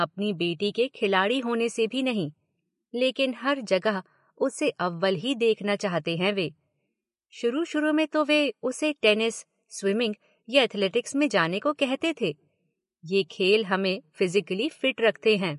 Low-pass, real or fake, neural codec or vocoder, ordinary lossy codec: 14.4 kHz; real; none; MP3, 48 kbps